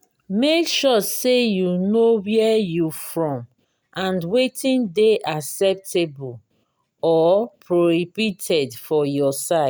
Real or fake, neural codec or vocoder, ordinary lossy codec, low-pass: real; none; none; none